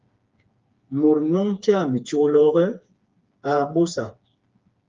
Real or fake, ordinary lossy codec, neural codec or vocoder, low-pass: fake; Opus, 32 kbps; codec, 16 kHz, 4 kbps, FreqCodec, smaller model; 7.2 kHz